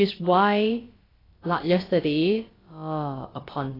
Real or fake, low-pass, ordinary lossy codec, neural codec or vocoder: fake; 5.4 kHz; AAC, 24 kbps; codec, 16 kHz, about 1 kbps, DyCAST, with the encoder's durations